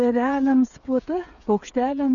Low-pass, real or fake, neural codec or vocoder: 7.2 kHz; fake; codec, 16 kHz, 8 kbps, FreqCodec, smaller model